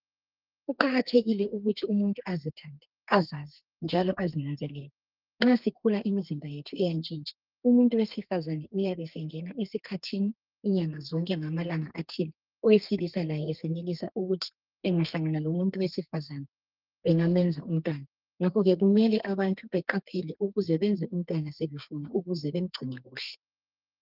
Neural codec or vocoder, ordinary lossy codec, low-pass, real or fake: codec, 32 kHz, 1.9 kbps, SNAC; Opus, 32 kbps; 5.4 kHz; fake